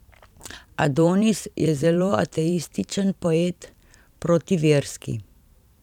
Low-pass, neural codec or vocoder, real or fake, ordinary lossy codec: 19.8 kHz; vocoder, 44.1 kHz, 128 mel bands every 512 samples, BigVGAN v2; fake; none